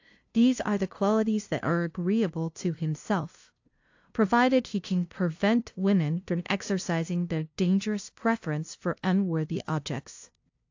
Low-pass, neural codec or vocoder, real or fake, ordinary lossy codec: 7.2 kHz; codec, 16 kHz, 0.5 kbps, FunCodec, trained on LibriTTS, 25 frames a second; fake; AAC, 48 kbps